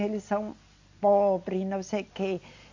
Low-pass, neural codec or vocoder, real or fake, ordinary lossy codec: 7.2 kHz; none; real; AAC, 48 kbps